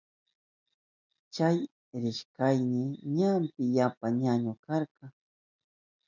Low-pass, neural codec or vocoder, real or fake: 7.2 kHz; none; real